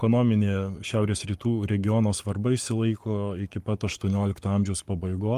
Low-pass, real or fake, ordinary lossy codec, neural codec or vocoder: 14.4 kHz; fake; Opus, 32 kbps; codec, 44.1 kHz, 7.8 kbps, Pupu-Codec